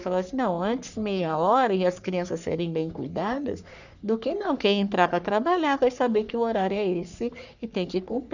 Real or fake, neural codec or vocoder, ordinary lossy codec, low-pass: fake; codec, 44.1 kHz, 3.4 kbps, Pupu-Codec; none; 7.2 kHz